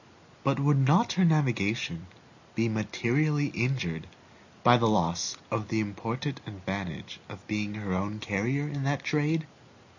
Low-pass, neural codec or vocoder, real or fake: 7.2 kHz; none; real